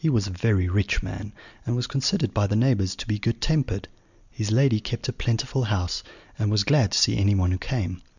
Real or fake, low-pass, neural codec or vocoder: real; 7.2 kHz; none